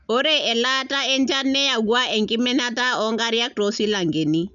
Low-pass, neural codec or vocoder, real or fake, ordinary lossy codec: 7.2 kHz; none; real; none